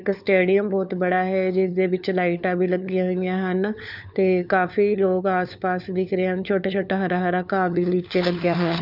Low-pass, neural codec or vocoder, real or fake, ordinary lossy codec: 5.4 kHz; codec, 16 kHz, 4 kbps, FunCodec, trained on LibriTTS, 50 frames a second; fake; none